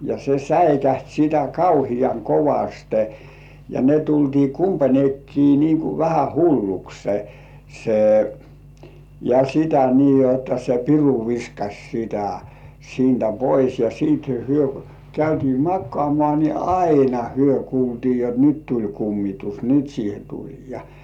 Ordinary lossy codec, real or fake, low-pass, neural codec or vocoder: none; real; 19.8 kHz; none